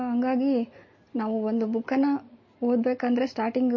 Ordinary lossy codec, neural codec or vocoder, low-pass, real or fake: MP3, 32 kbps; none; 7.2 kHz; real